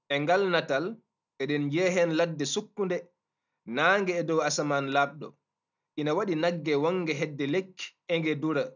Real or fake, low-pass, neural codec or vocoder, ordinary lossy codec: real; 7.2 kHz; none; none